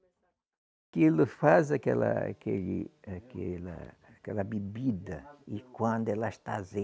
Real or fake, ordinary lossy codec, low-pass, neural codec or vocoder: real; none; none; none